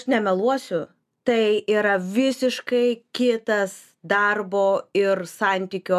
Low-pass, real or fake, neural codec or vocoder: 14.4 kHz; real; none